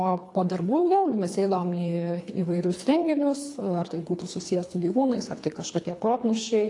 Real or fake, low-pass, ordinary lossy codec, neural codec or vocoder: fake; 10.8 kHz; AAC, 48 kbps; codec, 24 kHz, 3 kbps, HILCodec